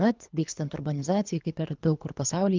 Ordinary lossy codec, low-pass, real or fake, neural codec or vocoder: Opus, 24 kbps; 7.2 kHz; fake; codec, 24 kHz, 3 kbps, HILCodec